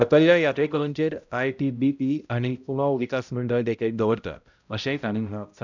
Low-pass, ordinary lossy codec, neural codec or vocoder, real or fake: 7.2 kHz; none; codec, 16 kHz, 0.5 kbps, X-Codec, HuBERT features, trained on balanced general audio; fake